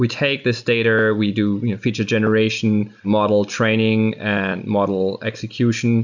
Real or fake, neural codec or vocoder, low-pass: real; none; 7.2 kHz